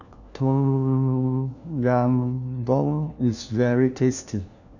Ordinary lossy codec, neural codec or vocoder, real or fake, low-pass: none; codec, 16 kHz, 1 kbps, FunCodec, trained on LibriTTS, 50 frames a second; fake; 7.2 kHz